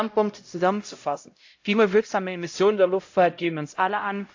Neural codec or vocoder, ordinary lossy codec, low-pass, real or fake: codec, 16 kHz, 0.5 kbps, X-Codec, HuBERT features, trained on LibriSpeech; AAC, 48 kbps; 7.2 kHz; fake